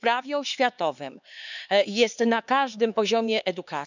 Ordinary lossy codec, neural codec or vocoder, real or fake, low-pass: none; codec, 16 kHz, 4 kbps, X-Codec, HuBERT features, trained on LibriSpeech; fake; 7.2 kHz